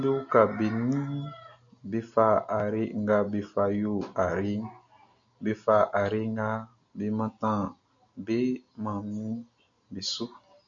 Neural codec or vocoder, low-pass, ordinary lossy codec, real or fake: none; 7.2 kHz; MP3, 64 kbps; real